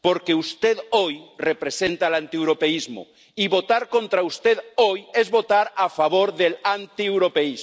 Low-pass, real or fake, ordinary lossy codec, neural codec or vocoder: none; real; none; none